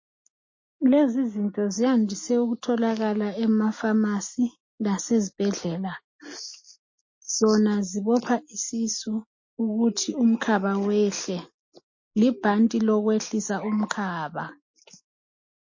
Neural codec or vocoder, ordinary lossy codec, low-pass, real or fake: none; MP3, 32 kbps; 7.2 kHz; real